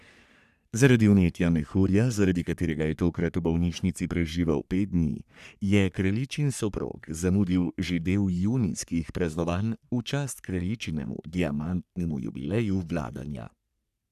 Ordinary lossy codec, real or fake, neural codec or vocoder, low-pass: none; fake; codec, 44.1 kHz, 3.4 kbps, Pupu-Codec; 14.4 kHz